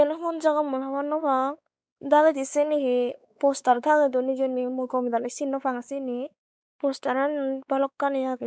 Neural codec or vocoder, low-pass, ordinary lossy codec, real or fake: codec, 16 kHz, 4 kbps, X-Codec, WavLM features, trained on Multilingual LibriSpeech; none; none; fake